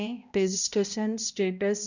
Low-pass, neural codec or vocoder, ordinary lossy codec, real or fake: 7.2 kHz; codec, 16 kHz, 0.5 kbps, X-Codec, HuBERT features, trained on balanced general audio; none; fake